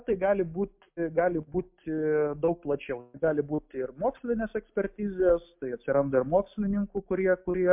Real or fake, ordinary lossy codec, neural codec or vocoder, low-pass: real; MP3, 32 kbps; none; 3.6 kHz